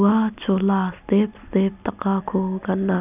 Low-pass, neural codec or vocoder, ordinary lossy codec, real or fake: 3.6 kHz; none; none; real